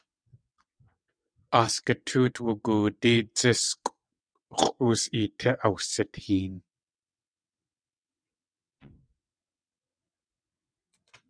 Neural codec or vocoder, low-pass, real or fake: vocoder, 22.05 kHz, 80 mel bands, WaveNeXt; 9.9 kHz; fake